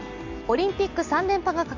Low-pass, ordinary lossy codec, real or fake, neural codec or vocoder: 7.2 kHz; none; real; none